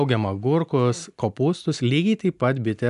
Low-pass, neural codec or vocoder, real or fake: 10.8 kHz; none; real